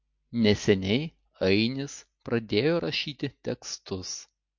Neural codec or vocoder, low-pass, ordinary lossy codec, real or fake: none; 7.2 kHz; MP3, 48 kbps; real